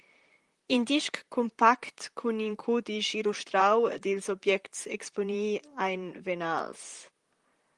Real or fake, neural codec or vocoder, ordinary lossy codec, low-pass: fake; vocoder, 44.1 kHz, 128 mel bands, Pupu-Vocoder; Opus, 24 kbps; 10.8 kHz